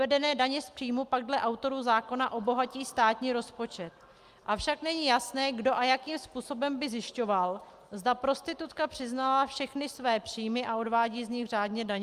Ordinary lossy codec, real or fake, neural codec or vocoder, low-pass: Opus, 32 kbps; real; none; 14.4 kHz